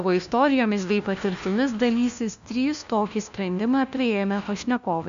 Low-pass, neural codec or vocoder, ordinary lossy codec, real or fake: 7.2 kHz; codec, 16 kHz, 1 kbps, FunCodec, trained on LibriTTS, 50 frames a second; AAC, 96 kbps; fake